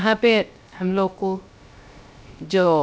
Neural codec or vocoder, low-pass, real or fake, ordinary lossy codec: codec, 16 kHz, 0.3 kbps, FocalCodec; none; fake; none